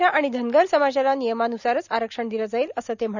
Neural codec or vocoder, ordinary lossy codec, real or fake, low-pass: none; none; real; 7.2 kHz